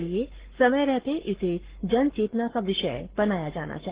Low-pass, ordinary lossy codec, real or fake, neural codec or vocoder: 3.6 kHz; Opus, 16 kbps; fake; codec, 44.1 kHz, 7.8 kbps, Pupu-Codec